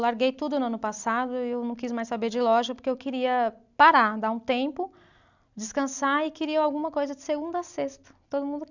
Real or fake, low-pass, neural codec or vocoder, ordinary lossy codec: real; 7.2 kHz; none; none